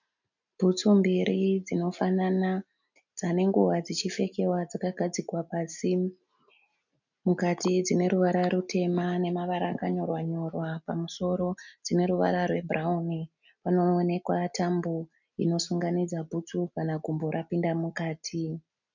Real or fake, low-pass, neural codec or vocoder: real; 7.2 kHz; none